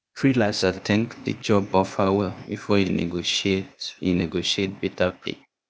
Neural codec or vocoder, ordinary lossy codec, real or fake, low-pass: codec, 16 kHz, 0.8 kbps, ZipCodec; none; fake; none